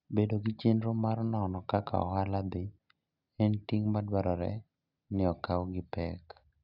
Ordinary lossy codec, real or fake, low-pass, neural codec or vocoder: none; real; 5.4 kHz; none